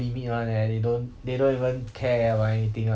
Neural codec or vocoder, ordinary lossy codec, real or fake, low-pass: none; none; real; none